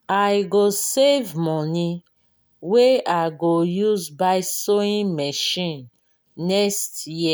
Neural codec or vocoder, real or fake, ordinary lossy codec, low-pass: none; real; none; none